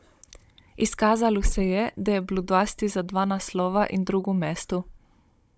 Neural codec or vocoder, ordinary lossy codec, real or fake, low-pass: codec, 16 kHz, 16 kbps, FunCodec, trained on Chinese and English, 50 frames a second; none; fake; none